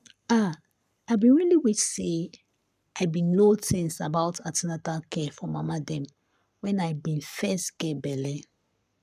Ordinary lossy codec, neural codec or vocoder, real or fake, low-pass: none; codec, 44.1 kHz, 7.8 kbps, Pupu-Codec; fake; 14.4 kHz